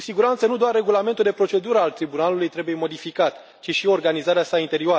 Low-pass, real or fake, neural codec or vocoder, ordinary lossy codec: none; real; none; none